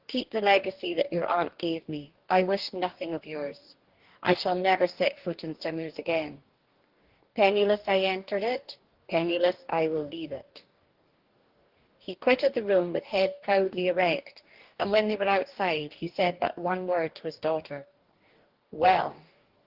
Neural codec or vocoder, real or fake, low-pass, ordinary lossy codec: codec, 44.1 kHz, 2.6 kbps, DAC; fake; 5.4 kHz; Opus, 16 kbps